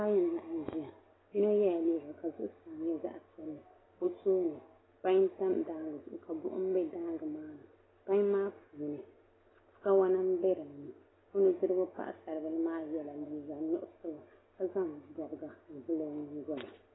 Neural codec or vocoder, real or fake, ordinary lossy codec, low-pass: none; real; AAC, 16 kbps; 7.2 kHz